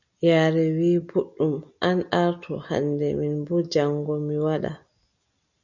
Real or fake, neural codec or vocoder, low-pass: real; none; 7.2 kHz